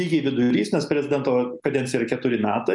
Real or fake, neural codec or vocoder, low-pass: real; none; 10.8 kHz